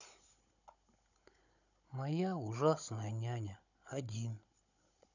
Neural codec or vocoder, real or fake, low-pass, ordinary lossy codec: codec, 16 kHz, 16 kbps, FunCodec, trained on Chinese and English, 50 frames a second; fake; 7.2 kHz; none